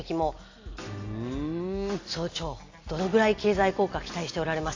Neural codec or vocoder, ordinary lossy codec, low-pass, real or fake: none; AAC, 32 kbps; 7.2 kHz; real